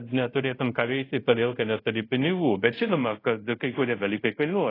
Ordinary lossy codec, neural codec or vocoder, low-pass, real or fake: AAC, 24 kbps; codec, 24 kHz, 0.5 kbps, DualCodec; 5.4 kHz; fake